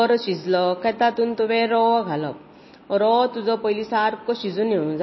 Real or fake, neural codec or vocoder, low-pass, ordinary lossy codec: real; none; 7.2 kHz; MP3, 24 kbps